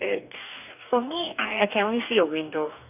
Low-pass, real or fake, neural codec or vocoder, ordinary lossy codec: 3.6 kHz; fake; codec, 44.1 kHz, 2.6 kbps, DAC; none